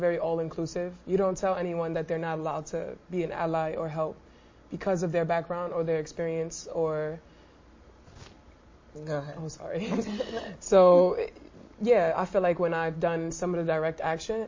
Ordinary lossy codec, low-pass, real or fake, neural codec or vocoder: MP3, 32 kbps; 7.2 kHz; real; none